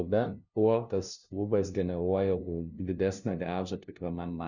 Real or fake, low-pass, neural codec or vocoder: fake; 7.2 kHz; codec, 16 kHz, 0.5 kbps, FunCodec, trained on LibriTTS, 25 frames a second